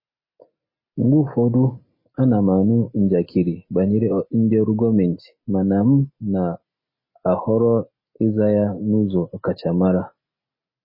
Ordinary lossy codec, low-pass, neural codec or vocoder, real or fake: MP3, 24 kbps; 5.4 kHz; none; real